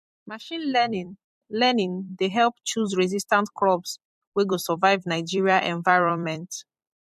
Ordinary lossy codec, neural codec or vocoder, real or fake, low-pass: MP3, 64 kbps; vocoder, 44.1 kHz, 128 mel bands every 256 samples, BigVGAN v2; fake; 14.4 kHz